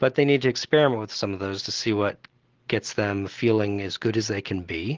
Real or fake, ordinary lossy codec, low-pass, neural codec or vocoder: real; Opus, 16 kbps; 7.2 kHz; none